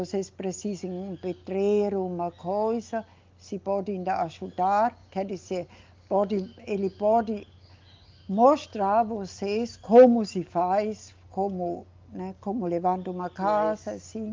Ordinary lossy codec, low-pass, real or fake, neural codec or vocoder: Opus, 32 kbps; 7.2 kHz; real; none